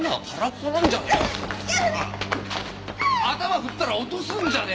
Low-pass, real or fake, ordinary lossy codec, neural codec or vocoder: none; real; none; none